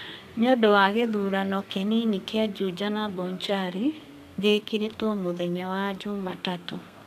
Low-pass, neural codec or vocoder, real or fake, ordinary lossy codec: 14.4 kHz; codec, 32 kHz, 1.9 kbps, SNAC; fake; none